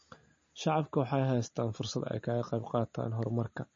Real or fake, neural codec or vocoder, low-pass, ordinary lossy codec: real; none; 7.2 kHz; MP3, 32 kbps